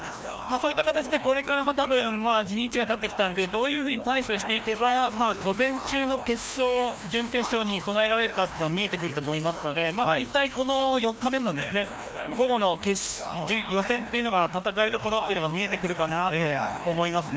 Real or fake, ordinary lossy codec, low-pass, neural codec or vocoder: fake; none; none; codec, 16 kHz, 1 kbps, FreqCodec, larger model